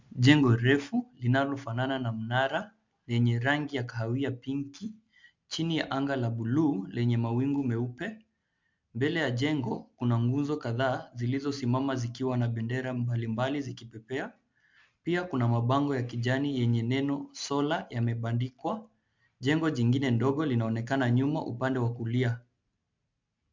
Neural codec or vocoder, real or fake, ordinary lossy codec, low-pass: none; real; MP3, 64 kbps; 7.2 kHz